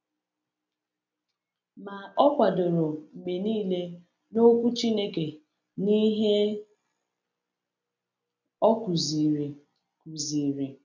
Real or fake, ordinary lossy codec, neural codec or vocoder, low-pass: real; none; none; 7.2 kHz